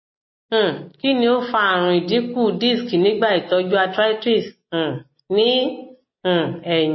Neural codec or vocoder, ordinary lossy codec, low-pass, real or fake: none; MP3, 24 kbps; 7.2 kHz; real